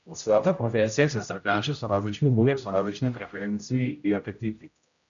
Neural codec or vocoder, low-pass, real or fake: codec, 16 kHz, 0.5 kbps, X-Codec, HuBERT features, trained on general audio; 7.2 kHz; fake